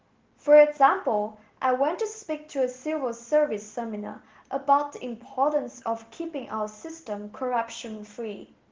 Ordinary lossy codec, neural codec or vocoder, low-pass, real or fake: Opus, 16 kbps; none; 7.2 kHz; real